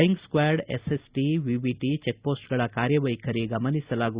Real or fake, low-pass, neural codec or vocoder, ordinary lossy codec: real; 3.6 kHz; none; Opus, 64 kbps